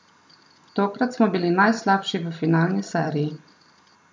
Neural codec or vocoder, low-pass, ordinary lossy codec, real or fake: none; none; none; real